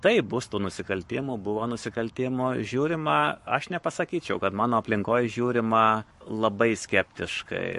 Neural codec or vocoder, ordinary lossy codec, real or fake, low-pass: codec, 44.1 kHz, 7.8 kbps, Pupu-Codec; MP3, 48 kbps; fake; 14.4 kHz